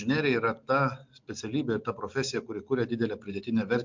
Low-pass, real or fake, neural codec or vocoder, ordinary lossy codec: 7.2 kHz; real; none; MP3, 64 kbps